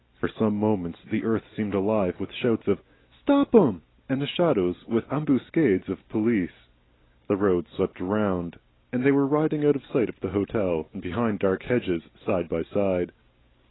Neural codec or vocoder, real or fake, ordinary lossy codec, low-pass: none; real; AAC, 16 kbps; 7.2 kHz